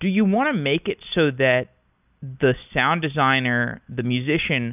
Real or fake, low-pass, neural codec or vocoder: real; 3.6 kHz; none